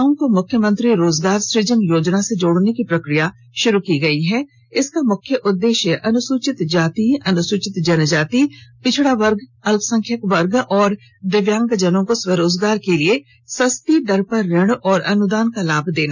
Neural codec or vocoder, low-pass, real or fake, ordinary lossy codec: none; none; real; none